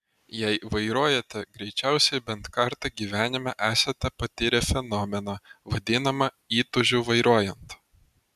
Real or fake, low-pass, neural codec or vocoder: real; 14.4 kHz; none